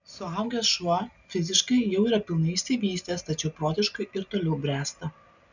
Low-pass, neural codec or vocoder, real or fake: 7.2 kHz; none; real